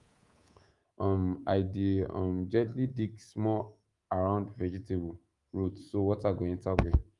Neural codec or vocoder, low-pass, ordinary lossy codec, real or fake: codec, 24 kHz, 3.1 kbps, DualCodec; 10.8 kHz; Opus, 32 kbps; fake